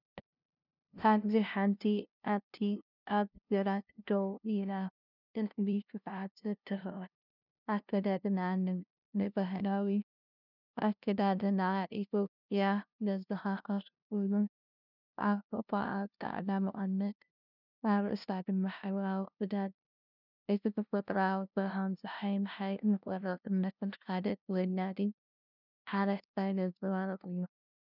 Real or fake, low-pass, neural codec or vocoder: fake; 5.4 kHz; codec, 16 kHz, 0.5 kbps, FunCodec, trained on LibriTTS, 25 frames a second